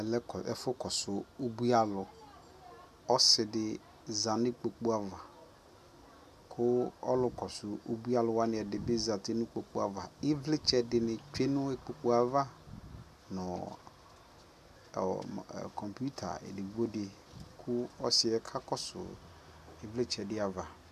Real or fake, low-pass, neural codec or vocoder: real; 14.4 kHz; none